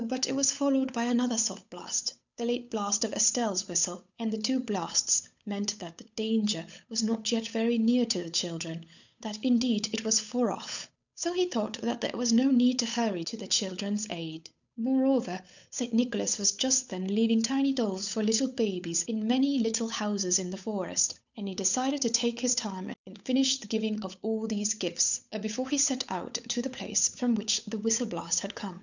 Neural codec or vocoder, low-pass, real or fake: codec, 16 kHz, 8 kbps, FunCodec, trained on Chinese and English, 25 frames a second; 7.2 kHz; fake